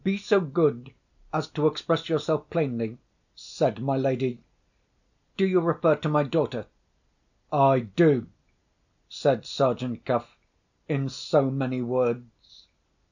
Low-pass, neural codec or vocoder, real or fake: 7.2 kHz; none; real